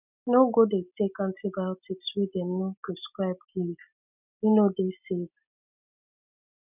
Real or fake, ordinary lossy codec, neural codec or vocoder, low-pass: real; none; none; 3.6 kHz